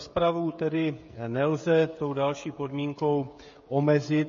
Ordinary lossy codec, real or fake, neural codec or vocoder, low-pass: MP3, 32 kbps; fake; codec, 16 kHz, 16 kbps, FreqCodec, smaller model; 7.2 kHz